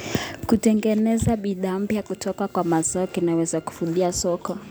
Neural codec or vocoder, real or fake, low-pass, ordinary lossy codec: none; real; none; none